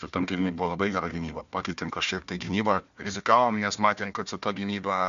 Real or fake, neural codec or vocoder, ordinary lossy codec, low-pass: fake; codec, 16 kHz, 1 kbps, FunCodec, trained on Chinese and English, 50 frames a second; MP3, 64 kbps; 7.2 kHz